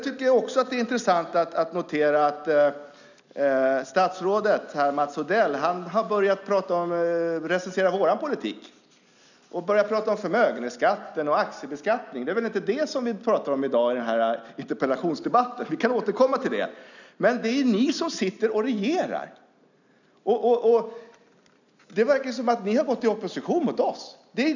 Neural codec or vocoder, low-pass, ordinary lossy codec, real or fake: none; 7.2 kHz; none; real